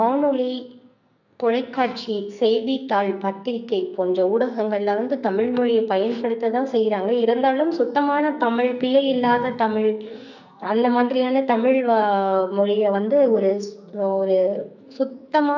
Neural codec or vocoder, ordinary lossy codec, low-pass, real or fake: codec, 44.1 kHz, 2.6 kbps, SNAC; none; 7.2 kHz; fake